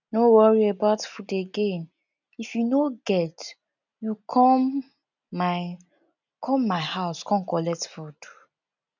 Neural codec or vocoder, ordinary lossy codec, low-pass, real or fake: none; none; 7.2 kHz; real